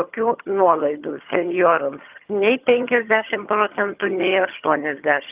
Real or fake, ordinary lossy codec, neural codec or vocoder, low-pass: fake; Opus, 24 kbps; vocoder, 22.05 kHz, 80 mel bands, HiFi-GAN; 3.6 kHz